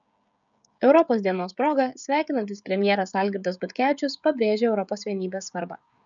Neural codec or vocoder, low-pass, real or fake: codec, 16 kHz, 16 kbps, FreqCodec, smaller model; 7.2 kHz; fake